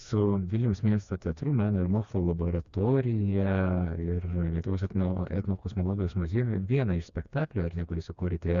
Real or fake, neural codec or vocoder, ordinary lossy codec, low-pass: fake; codec, 16 kHz, 2 kbps, FreqCodec, smaller model; Opus, 64 kbps; 7.2 kHz